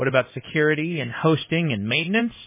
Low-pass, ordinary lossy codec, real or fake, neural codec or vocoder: 3.6 kHz; MP3, 16 kbps; fake; codec, 16 kHz in and 24 kHz out, 1 kbps, XY-Tokenizer